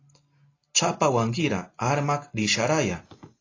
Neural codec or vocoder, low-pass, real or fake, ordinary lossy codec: none; 7.2 kHz; real; AAC, 32 kbps